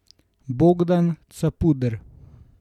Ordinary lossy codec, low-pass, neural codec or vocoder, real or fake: none; 19.8 kHz; none; real